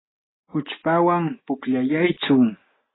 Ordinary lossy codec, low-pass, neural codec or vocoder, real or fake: AAC, 16 kbps; 7.2 kHz; none; real